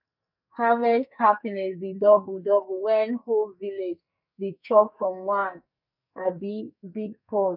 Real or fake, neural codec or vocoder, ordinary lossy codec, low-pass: fake; codec, 44.1 kHz, 2.6 kbps, SNAC; none; 5.4 kHz